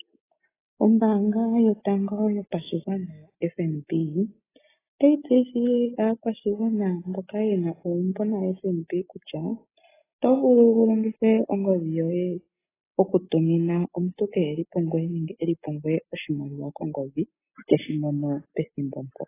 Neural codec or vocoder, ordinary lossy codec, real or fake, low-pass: none; AAC, 16 kbps; real; 3.6 kHz